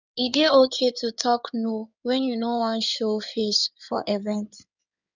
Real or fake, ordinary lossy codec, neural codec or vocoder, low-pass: fake; none; codec, 16 kHz in and 24 kHz out, 2.2 kbps, FireRedTTS-2 codec; 7.2 kHz